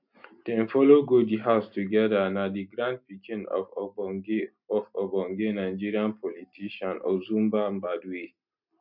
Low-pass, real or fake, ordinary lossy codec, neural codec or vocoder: 5.4 kHz; real; none; none